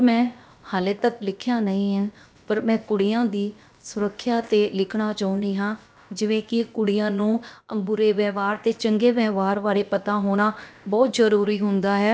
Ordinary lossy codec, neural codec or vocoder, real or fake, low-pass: none; codec, 16 kHz, about 1 kbps, DyCAST, with the encoder's durations; fake; none